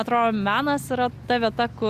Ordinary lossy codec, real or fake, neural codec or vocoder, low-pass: Opus, 64 kbps; real; none; 14.4 kHz